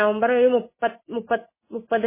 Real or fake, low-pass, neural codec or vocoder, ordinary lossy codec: real; 3.6 kHz; none; MP3, 16 kbps